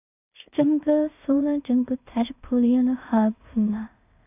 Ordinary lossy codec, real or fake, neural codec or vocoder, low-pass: AAC, 32 kbps; fake; codec, 16 kHz in and 24 kHz out, 0.4 kbps, LongCat-Audio-Codec, two codebook decoder; 3.6 kHz